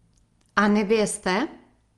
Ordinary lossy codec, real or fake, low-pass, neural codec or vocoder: Opus, 24 kbps; real; 10.8 kHz; none